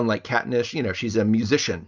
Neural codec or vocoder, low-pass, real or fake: none; 7.2 kHz; real